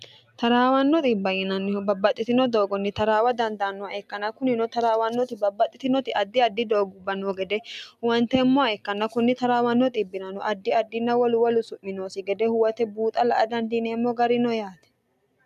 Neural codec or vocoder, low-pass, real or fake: none; 14.4 kHz; real